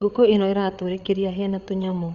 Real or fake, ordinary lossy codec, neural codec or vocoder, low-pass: fake; Opus, 64 kbps; codec, 16 kHz, 8 kbps, FreqCodec, larger model; 7.2 kHz